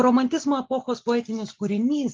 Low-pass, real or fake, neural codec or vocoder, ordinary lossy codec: 7.2 kHz; real; none; Opus, 24 kbps